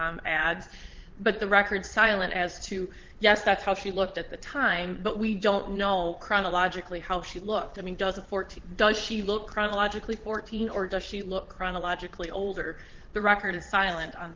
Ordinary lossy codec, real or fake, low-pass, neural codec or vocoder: Opus, 16 kbps; fake; 7.2 kHz; vocoder, 22.05 kHz, 80 mel bands, Vocos